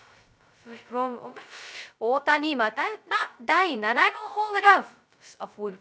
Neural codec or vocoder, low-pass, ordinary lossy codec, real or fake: codec, 16 kHz, 0.2 kbps, FocalCodec; none; none; fake